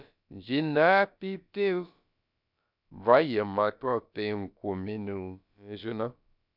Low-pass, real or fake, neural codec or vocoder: 5.4 kHz; fake; codec, 16 kHz, about 1 kbps, DyCAST, with the encoder's durations